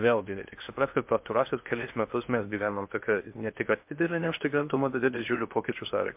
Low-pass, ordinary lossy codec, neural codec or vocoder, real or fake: 3.6 kHz; MP3, 32 kbps; codec, 16 kHz in and 24 kHz out, 0.6 kbps, FocalCodec, streaming, 2048 codes; fake